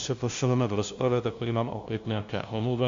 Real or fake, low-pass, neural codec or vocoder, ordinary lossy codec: fake; 7.2 kHz; codec, 16 kHz, 0.5 kbps, FunCodec, trained on LibriTTS, 25 frames a second; MP3, 64 kbps